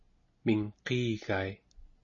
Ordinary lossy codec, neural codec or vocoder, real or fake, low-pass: MP3, 32 kbps; none; real; 7.2 kHz